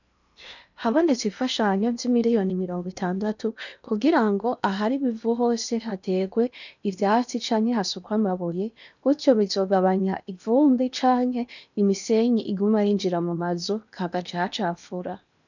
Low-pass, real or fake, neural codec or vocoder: 7.2 kHz; fake; codec, 16 kHz in and 24 kHz out, 0.8 kbps, FocalCodec, streaming, 65536 codes